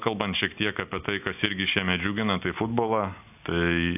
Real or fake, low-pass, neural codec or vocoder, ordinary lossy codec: real; 3.6 kHz; none; AAC, 32 kbps